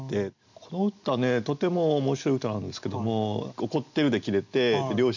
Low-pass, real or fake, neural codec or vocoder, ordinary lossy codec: 7.2 kHz; real; none; none